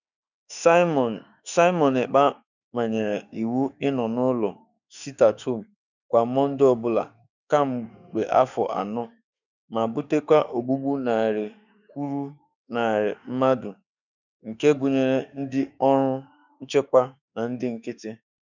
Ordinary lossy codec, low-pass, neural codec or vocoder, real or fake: none; 7.2 kHz; autoencoder, 48 kHz, 32 numbers a frame, DAC-VAE, trained on Japanese speech; fake